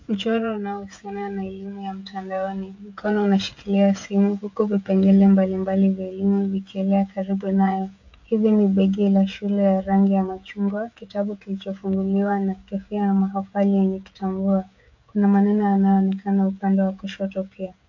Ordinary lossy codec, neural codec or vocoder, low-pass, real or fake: AAC, 48 kbps; codec, 16 kHz, 16 kbps, FreqCodec, smaller model; 7.2 kHz; fake